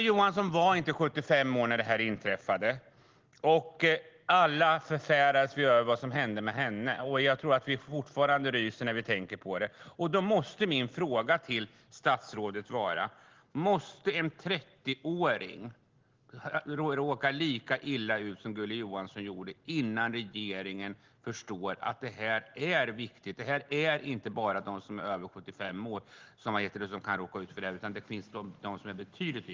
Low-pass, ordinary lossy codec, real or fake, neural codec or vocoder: 7.2 kHz; Opus, 16 kbps; real; none